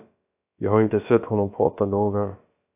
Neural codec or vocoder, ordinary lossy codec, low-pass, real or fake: codec, 16 kHz, about 1 kbps, DyCAST, with the encoder's durations; AAC, 24 kbps; 3.6 kHz; fake